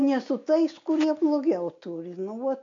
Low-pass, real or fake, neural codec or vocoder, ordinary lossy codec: 7.2 kHz; real; none; MP3, 48 kbps